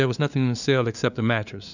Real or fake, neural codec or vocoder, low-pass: fake; codec, 16 kHz, 2 kbps, FunCodec, trained on LibriTTS, 25 frames a second; 7.2 kHz